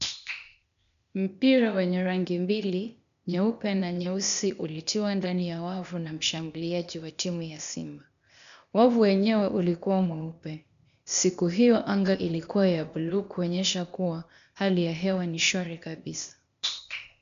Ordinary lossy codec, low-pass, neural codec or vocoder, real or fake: MP3, 96 kbps; 7.2 kHz; codec, 16 kHz, 0.8 kbps, ZipCodec; fake